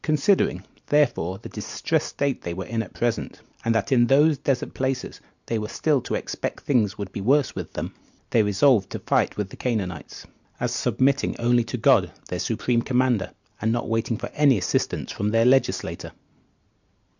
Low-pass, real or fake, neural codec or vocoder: 7.2 kHz; real; none